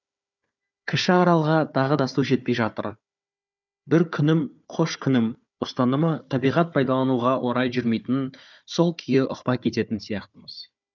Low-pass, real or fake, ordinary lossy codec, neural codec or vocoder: 7.2 kHz; fake; none; codec, 16 kHz, 4 kbps, FunCodec, trained on Chinese and English, 50 frames a second